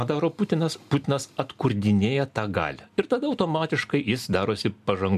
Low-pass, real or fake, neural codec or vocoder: 14.4 kHz; real; none